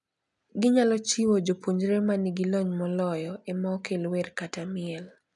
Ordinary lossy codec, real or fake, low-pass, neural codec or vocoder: none; real; 10.8 kHz; none